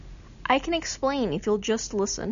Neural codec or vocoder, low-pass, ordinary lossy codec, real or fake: none; 7.2 kHz; MP3, 96 kbps; real